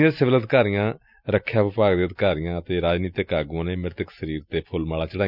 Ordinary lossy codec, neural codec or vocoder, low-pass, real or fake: none; none; 5.4 kHz; real